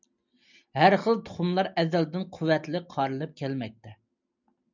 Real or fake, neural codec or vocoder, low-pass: real; none; 7.2 kHz